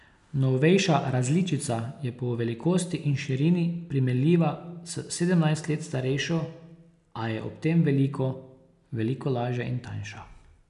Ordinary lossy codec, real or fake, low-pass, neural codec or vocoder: none; real; 10.8 kHz; none